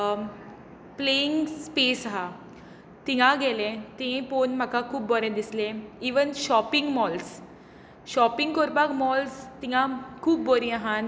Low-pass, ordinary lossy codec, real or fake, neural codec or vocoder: none; none; real; none